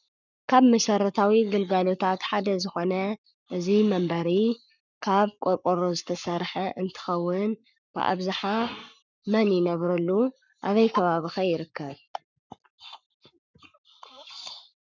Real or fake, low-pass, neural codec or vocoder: fake; 7.2 kHz; codec, 44.1 kHz, 7.8 kbps, Pupu-Codec